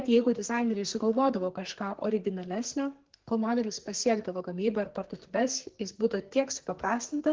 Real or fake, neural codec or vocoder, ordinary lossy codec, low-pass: fake; codec, 44.1 kHz, 2.6 kbps, SNAC; Opus, 16 kbps; 7.2 kHz